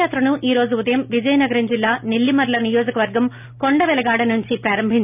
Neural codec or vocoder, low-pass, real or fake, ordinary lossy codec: none; 3.6 kHz; real; none